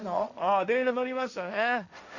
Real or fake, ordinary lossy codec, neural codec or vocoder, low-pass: fake; none; codec, 16 kHz, 1.1 kbps, Voila-Tokenizer; 7.2 kHz